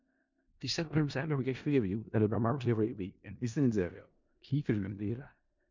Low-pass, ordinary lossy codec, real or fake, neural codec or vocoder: 7.2 kHz; MP3, 64 kbps; fake; codec, 16 kHz in and 24 kHz out, 0.4 kbps, LongCat-Audio-Codec, four codebook decoder